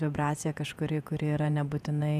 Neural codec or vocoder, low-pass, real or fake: vocoder, 48 kHz, 128 mel bands, Vocos; 14.4 kHz; fake